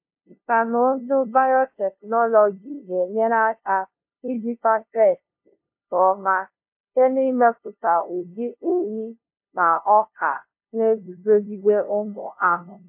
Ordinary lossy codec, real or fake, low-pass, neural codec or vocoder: MP3, 32 kbps; fake; 3.6 kHz; codec, 16 kHz, 0.5 kbps, FunCodec, trained on LibriTTS, 25 frames a second